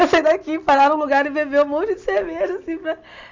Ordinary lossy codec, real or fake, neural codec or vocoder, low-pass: MP3, 64 kbps; real; none; 7.2 kHz